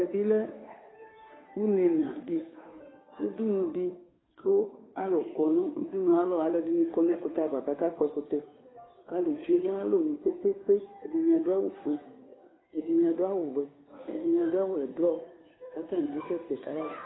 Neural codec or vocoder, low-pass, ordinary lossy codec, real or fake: codec, 16 kHz, 2 kbps, FunCodec, trained on Chinese and English, 25 frames a second; 7.2 kHz; AAC, 16 kbps; fake